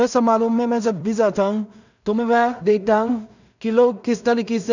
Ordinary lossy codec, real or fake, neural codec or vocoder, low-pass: none; fake; codec, 16 kHz in and 24 kHz out, 0.4 kbps, LongCat-Audio-Codec, two codebook decoder; 7.2 kHz